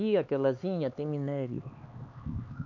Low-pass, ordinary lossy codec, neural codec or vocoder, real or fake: 7.2 kHz; MP3, 48 kbps; codec, 16 kHz, 4 kbps, X-Codec, HuBERT features, trained on LibriSpeech; fake